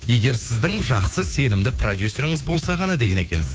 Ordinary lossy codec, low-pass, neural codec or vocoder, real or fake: none; none; codec, 16 kHz, 2 kbps, FunCodec, trained on Chinese and English, 25 frames a second; fake